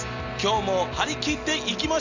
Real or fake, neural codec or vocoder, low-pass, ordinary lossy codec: real; none; 7.2 kHz; none